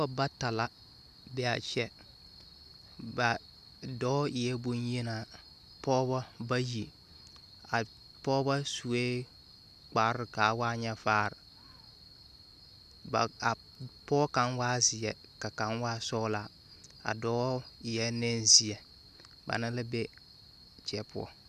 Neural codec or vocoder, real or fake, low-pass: none; real; 14.4 kHz